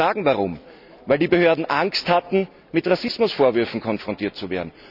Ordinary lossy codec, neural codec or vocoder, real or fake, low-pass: none; none; real; 5.4 kHz